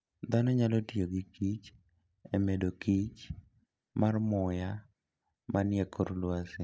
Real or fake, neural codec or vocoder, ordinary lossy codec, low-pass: real; none; none; none